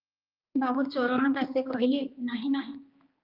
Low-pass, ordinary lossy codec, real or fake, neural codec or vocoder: 5.4 kHz; Opus, 24 kbps; fake; codec, 16 kHz, 2 kbps, X-Codec, HuBERT features, trained on general audio